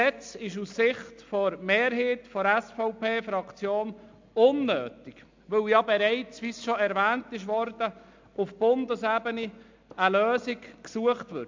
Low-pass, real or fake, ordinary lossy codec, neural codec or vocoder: 7.2 kHz; real; MP3, 64 kbps; none